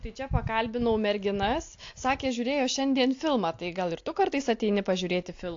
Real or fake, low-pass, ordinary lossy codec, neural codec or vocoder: real; 7.2 kHz; AAC, 48 kbps; none